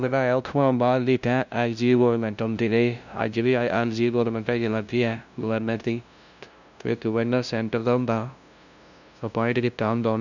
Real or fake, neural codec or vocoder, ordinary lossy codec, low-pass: fake; codec, 16 kHz, 0.5 kbps, FunCodec, trained on LibriTTS, 25 frames a second; none; 7.2 kHz